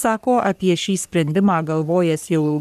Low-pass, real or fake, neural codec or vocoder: 14.4 kHz; fake; codec, 44.1 kHz, 3.4 kbps, Pupu-Codec